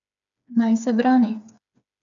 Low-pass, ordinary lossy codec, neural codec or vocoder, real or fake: 7.2 kHz; none; codec, 16 kHz, 4 kbps, FreqCodec, smaller model; fake